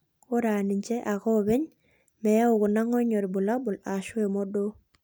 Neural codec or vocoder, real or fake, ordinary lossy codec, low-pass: none; real; none; none